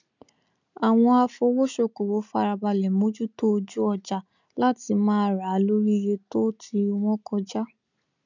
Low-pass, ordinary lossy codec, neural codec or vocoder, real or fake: 7.2 kHz; none; none; real